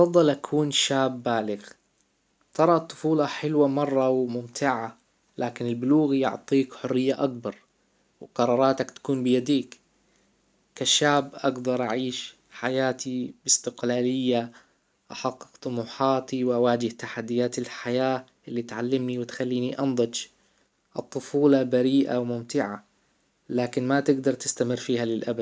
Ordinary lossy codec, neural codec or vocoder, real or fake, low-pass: none; none; real; none